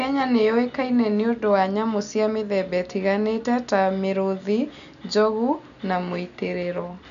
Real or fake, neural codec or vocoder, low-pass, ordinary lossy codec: real; none; 7.2 kHz; MP3, 96 kbps